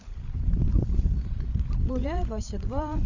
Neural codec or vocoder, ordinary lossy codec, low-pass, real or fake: codec, 16 kHz, 8 kbps, FreqCodec, larger model; none; 7.2 kHz; fake